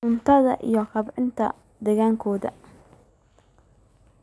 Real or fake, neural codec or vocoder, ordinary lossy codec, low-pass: real; none; none; none